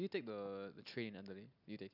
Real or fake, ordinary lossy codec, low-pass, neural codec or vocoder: real; none; 5.4 kHz; none